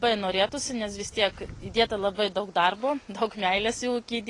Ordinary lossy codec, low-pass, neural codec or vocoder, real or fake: AAC, 32 kbps; 10.8 kHz; none; real